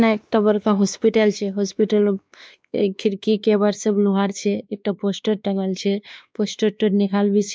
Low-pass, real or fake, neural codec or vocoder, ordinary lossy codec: none; fake; codec, 16 kHz, 2 kbps, X-Codec, WavLM features, trained on Multilingual LibriSpeech; none